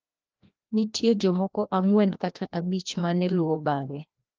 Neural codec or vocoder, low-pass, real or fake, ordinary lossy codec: codec, 16 kHz, 1 kbps, FreqCodec, larger model; 7.2 kHz; fake; Opus, 24 kbps